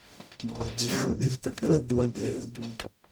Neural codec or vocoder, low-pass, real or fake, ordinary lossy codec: codec, 44.1 kHz, 0.9 kbps, DAC; none; fake; none